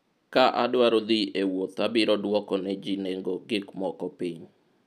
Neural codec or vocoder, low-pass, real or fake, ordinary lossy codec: none; 14.4 kHz; real; none